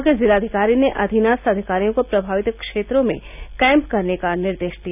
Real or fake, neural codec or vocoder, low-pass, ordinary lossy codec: real; none; 3.6 kHz; none